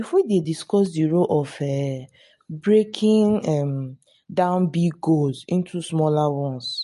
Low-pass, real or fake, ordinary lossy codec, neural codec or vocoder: 14.4 kHz; real; MP3, 48 kbps; none